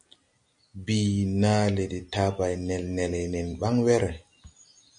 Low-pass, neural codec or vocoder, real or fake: 9.9 kHz; none; real